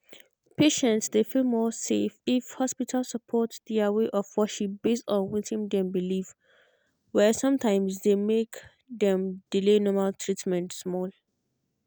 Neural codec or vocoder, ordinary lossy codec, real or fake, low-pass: none; none; real; none